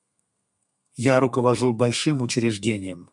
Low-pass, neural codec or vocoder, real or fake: 10.8 kHz; codec, 32 kHz, 1.9 kbps, SNAC; fake